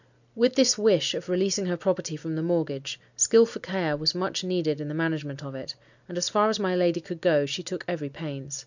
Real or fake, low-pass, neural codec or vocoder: real; 7.2 kHz; none